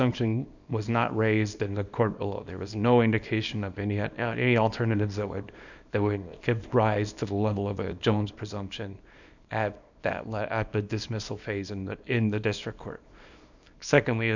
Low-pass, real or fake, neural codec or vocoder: 7.2 kHz; fake; codec, 24 kHz, 0.9 kbps, WavTokenizer, small release